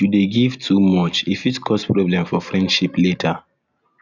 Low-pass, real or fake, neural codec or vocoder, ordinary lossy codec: 7.2 kHz; real; none; none